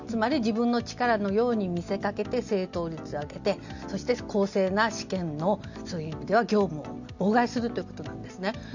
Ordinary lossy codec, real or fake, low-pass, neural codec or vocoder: none; real; 7.2 kHz; none